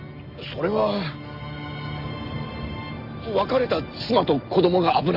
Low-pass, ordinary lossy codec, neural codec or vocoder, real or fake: 5.4 kHz; Opus, 32 kbps; none; real